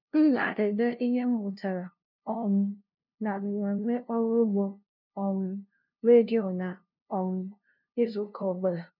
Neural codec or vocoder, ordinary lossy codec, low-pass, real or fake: codec, 16 kHz, 0.5 kbps, FunCodec, trained on LibriTTS, 25 frames a second; none; 5.4 kHz; fake